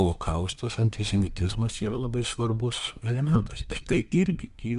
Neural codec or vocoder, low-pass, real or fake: codec, 24 kHz, 1 kbps, SNAC; 10.8 kHz; fake